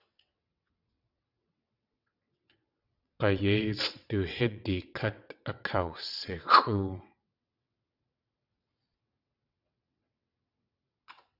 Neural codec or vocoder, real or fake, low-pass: vocoder, 22.05 kHz, 80 mel bands, WaveNeXt; fake; 5.4 kHz